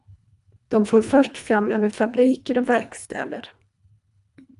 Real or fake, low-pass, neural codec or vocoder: fake; 10.8 kHz; codec, 24 kHz, 1.5 kbps, HILCodec